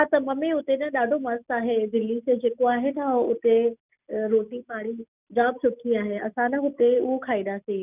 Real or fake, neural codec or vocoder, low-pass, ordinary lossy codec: real; none; 3.6 kHz; none